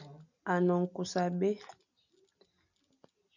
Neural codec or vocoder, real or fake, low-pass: none; real; 7.2 kHz